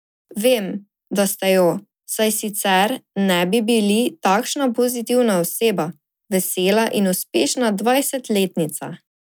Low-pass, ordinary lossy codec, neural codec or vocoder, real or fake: none; none; none; real